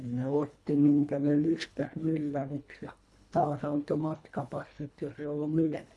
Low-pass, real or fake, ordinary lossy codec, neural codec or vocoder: none; fake; none; codec, 24 kHz, 1.5 kbps, HILCodec